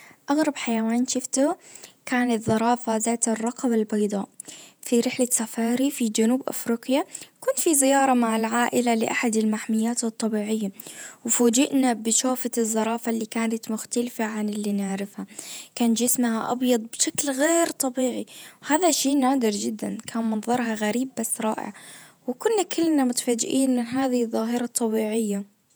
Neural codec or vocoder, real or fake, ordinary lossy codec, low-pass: vocoder, 48 kHz, 128 mel bands, Vocos; fake; none; none